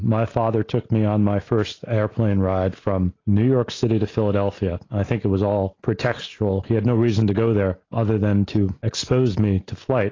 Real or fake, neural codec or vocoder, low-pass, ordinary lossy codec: real; none; 7.2 kHz; AAC, 32 kbps